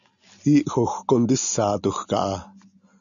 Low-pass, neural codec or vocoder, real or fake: 7.2 kHz; none; real